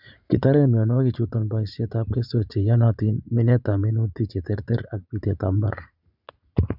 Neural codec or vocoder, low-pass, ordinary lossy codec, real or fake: vocoder, 44.1 kHz, 80 mel bands, Vocos; 5.4 kHz; none; fake